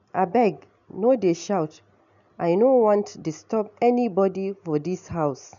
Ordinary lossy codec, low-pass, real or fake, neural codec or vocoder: none; 7.2 kHz; real; none